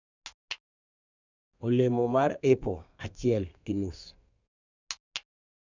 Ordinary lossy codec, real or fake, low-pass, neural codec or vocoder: none; fake; 7.2 kHz; codec, 32 kHz, 1.9 kbps, SNAC